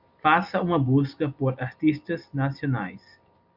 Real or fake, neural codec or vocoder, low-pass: real; none; 5.4 kHz